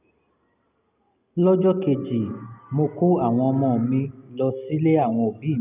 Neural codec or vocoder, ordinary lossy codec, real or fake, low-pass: none; none; real; 3.6 kHz